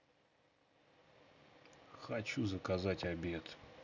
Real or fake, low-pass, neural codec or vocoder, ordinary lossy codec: real; 7.2 kHz; none; none